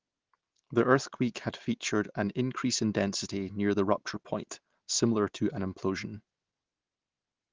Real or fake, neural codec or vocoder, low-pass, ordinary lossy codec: real; none; 7.2 kHz; Opus, 16 kbps